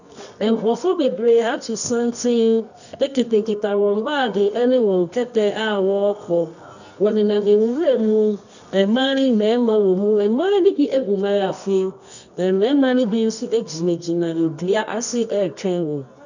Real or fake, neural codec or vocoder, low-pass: fake; codec, 24 kHz, 0.9 kbps, WavTokenizer, medium music audio release; 7.2 kHz